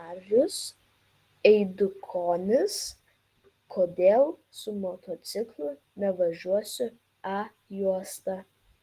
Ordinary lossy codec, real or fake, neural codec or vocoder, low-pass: Opus, 24 kbps; real; none; 14.4 kHz